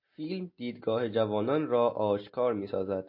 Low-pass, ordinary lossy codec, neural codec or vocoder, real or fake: 5.4 kHz; MP3, 32 kbps; none; real